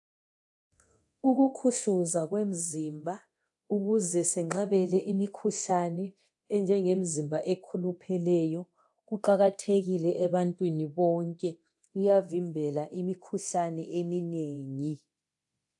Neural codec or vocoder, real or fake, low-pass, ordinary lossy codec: codec, 24 kHz, 0.9 kbps, DualCodec; fake; 10.8 kHz; AAC, 64 kbps